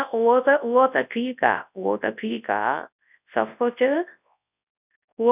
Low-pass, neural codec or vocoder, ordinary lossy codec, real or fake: 3.6 kHz; codec, 24 kHz, 0.9 kbps, WavTokenizer, large speech release; none; fake